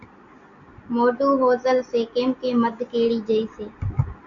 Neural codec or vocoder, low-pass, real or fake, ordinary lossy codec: none; 7.2 kHz; real; AAC, 64 kbps